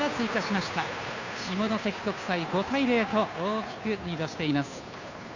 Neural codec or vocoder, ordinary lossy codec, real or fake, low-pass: codec, 16 kHz, 2 kbps, FunCodec, trained on Chinese and English, 25 frames a second; none; fake; 7.2 kHz